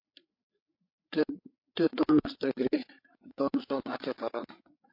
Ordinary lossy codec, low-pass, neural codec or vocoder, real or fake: MP3, 32 kbps; 5.4 kHz; codec, 16 kHz, 8 kbps, FreqCodec, larger model; fake